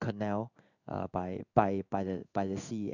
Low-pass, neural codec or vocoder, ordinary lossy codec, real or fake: 7.2 kHz; codec, 16 kHz in and 24 kHz out, 1 kbps, XY-Tokenizer; none; fake